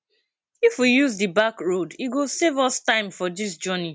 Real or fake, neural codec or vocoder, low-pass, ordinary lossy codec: real; none; none; none